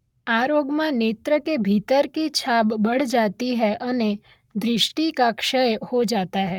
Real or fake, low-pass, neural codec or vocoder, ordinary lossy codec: fake; 19.8 kHz; codec, 44.1 kHz, 7.8 kbps, Pupu-Codec; none